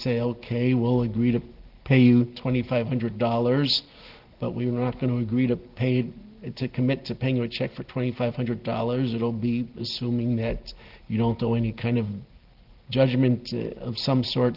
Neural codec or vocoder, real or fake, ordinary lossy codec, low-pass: none; real; Opus, 16 kbps; 5.4 kHz